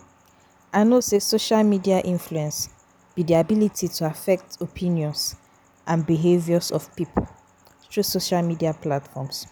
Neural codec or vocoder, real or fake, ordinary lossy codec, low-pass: none; real; none; none